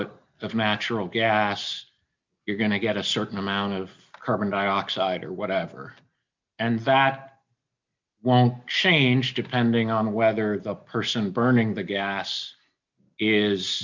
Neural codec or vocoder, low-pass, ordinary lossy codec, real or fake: none; 7.2 kHz; MP3, 64 kbps; real